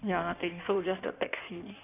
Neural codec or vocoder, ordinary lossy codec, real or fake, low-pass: codec, 16 kHz in and 24 kHz out, 1.1 kbps, FireRedTTS-2 codec; none; fake; 3.6 kHz